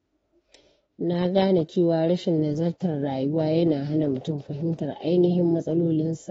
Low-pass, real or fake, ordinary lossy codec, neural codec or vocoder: 19.8 kHz; fake; AAC, 24 kbps; autoencoder, 48 kHz, 32 numbers a frame, DAC-VAE, trained on Japanese speech